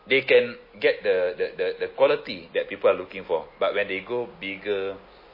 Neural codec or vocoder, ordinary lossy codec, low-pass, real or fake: none; MP3, 24 kbps; 5.4 kHz; real